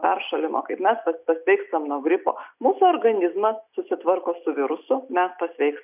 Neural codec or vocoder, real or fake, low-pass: none; real; 3.6 kHz